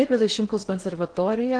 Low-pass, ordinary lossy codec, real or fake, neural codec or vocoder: 9.9 kHz; Opus, 16 kbps; fake; codec, 16 kHz in and 24 kHz out, 0.6 kbps, FocalCodec, streaming, 2048 codes